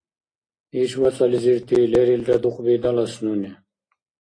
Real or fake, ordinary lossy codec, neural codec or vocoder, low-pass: real; AAC, 32 kbps; none; 9.9 kHz